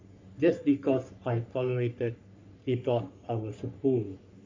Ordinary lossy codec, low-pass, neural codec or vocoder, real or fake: MP3, 64 kbps; 7.2 kHz; codec, 44.1 kHz, 3.4 kbps, Pupu-Codec; fake